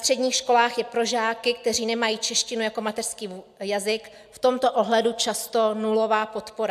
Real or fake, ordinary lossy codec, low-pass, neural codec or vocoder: real; AAC, 96 kbps; 14.4 kHz; none